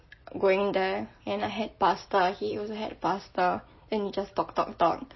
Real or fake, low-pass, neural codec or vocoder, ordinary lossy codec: real; 7.2 kHz; none; MP3, 24 kbps